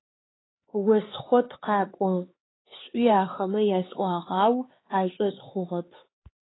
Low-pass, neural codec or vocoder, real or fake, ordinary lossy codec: 7.2 kHz; codec, 16 kHz, 4 kbps, X-Codec, HuBERT features, trained on LibriSpeech; fake; AAC, 16 kbps